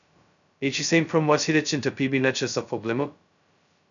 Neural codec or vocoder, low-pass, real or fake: codec, 16 kHz, 0.2 kbps, FocalCodec; 7.2 kHz; fake